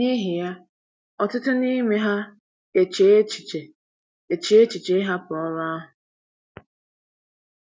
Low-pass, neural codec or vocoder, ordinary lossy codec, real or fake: none; none; none; real